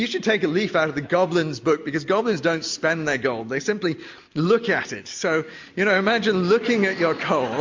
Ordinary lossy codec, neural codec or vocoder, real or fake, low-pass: MP3, 48 kbps; none; real; 7.2 kHz